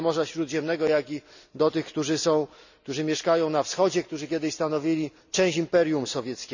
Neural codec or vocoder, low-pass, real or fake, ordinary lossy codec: none; 7.2 kHz; real; none